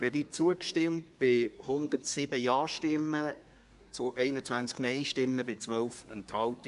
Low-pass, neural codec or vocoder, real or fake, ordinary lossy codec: 10.8 kHz; codec, 24 kHz, 1 kbps, SNAC; fake; none